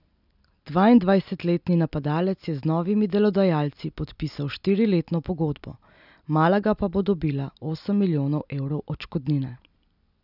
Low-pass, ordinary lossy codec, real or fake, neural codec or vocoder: 5.4 kHz; none; real; none